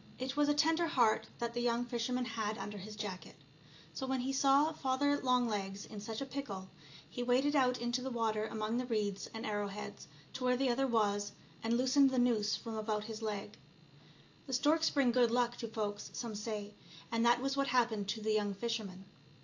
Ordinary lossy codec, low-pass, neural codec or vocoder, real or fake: AAC, 48 kbps; 7.2 kHz; none; real